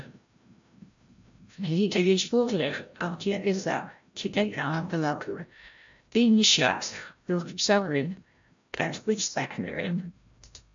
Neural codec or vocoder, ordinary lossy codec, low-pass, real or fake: codec, 16 kHz, 0.5 kbps, FreqCodec, larger model; AAC, 64 kbps; 7.2 kHz; fake